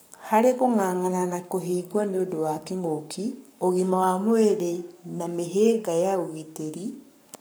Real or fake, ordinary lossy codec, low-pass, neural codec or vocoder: fake; none; none; codec, 44.1 kHz, 7.8 kbps, Pupu-Codec